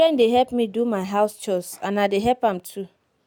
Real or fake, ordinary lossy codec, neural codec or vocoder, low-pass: real; none; none; none